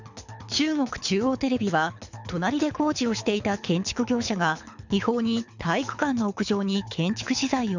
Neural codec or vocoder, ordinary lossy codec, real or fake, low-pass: codec, 24 kHz, 6 kbps, HILCodec; none; fake; 7.2 kHz